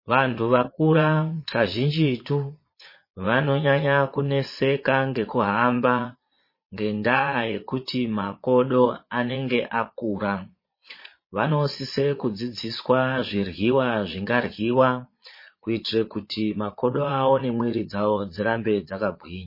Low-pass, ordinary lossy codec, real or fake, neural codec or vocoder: 5.4 kHz; MP3, 24 kbps; fake; vocoder, 22.05 kHz, 80 mel bands, Vocos